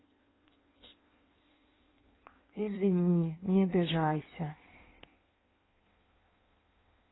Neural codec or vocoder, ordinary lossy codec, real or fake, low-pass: codec, 16 kHz in and 24 kHz out, 1.1 kbps, FireRedTTS-2 codec; AAC, 16 kbps; fake; 7.2 kHz